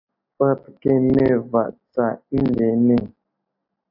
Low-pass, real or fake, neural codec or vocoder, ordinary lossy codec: 5.4 kHz; real; none; AAC, 48 kbps